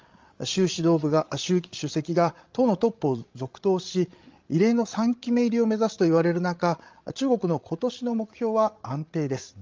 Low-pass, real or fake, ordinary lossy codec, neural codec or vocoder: 7.2 kHz; fake; Opus, 32 kbps; codec, 16 kHz, 16 kbps, FreqCodec, larger model